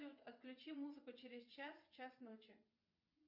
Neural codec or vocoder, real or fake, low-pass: vocoder, 22.05 kHz, 80 mel bands, WaveNeXt; fake; 5.4 kHz